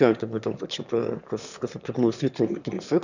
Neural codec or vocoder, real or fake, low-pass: autoencoder, 22.05 kHz, a latent of 192 numbers a frame, VITS, trained on one speaker; fake; 7.2 kHz